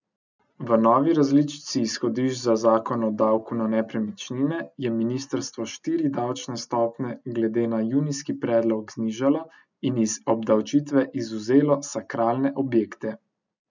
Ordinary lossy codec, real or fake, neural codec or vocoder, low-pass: none; real; none; 7.2 kHz